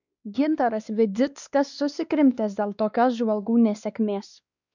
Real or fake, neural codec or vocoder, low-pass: fake; codec, 16 kHz, 2 kbps, X-Codec, WavLM features, trained on Multilingual LibriSpeech; 7.2 kHz